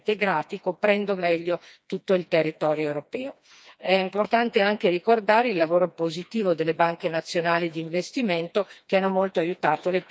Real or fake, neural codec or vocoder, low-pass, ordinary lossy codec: fake; codec, 16 kHz, 2 kbps, FreqCodec, smaller model; none; none